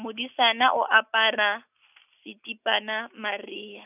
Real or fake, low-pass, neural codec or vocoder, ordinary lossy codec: real; 3.6 kHz; none; none